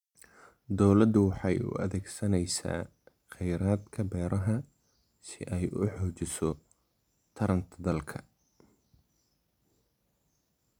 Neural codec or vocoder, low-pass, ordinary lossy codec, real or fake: none; 19.8 kHz; none; real